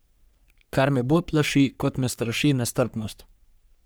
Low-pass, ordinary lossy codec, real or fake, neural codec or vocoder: none; none; fake; codec, 44.1 kHz, 3.4 kbps, Pupu-Codec